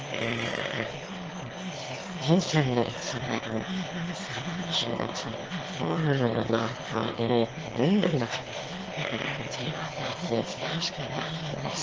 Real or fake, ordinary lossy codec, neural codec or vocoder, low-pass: fake; Opus, 16 kbps; autoencoder, 22.05 kHz, a latent of 192 numbers a frame, VITS, trained on one speaker; 7.2 kHz